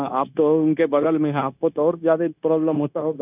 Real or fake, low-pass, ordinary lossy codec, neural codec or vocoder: fake; 3.6 kHz; none; codec, 16 kHz, 0.9 kbps, LongCat-Audio-Codec